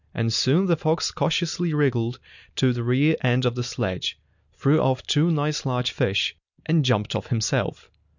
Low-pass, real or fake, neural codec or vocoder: 7.2 kHz; real; none